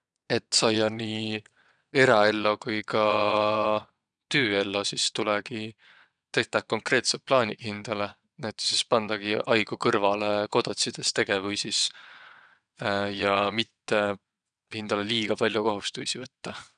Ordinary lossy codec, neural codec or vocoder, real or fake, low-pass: none; vocoder, 22.05 kHz, 80 mel bands, WaveNeXt; fake; 9.9 kHz